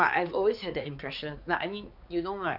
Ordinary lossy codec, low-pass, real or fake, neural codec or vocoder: none; 5.4 kHz; fake; codec, 16 kHz, 4 kbps, X-Codec, HuBERT features, trained on balanced general audio